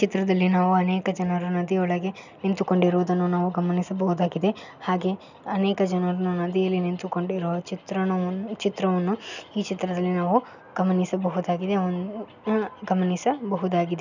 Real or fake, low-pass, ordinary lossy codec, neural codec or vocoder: real; 7.2 kHz; none; none